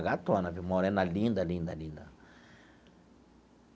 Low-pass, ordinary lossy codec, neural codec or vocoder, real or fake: none; none; none; real